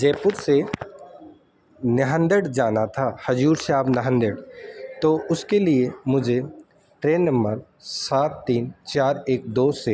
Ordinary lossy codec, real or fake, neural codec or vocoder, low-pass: none; real; none; none